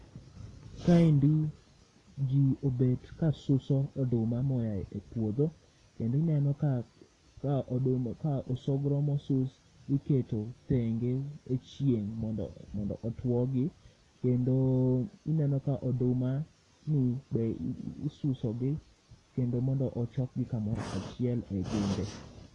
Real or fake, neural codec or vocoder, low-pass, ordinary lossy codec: real; none; 10.8 kHz; AAC, 32 kbps